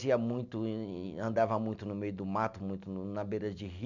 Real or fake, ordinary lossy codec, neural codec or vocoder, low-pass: real; none; none; 7.2 kHz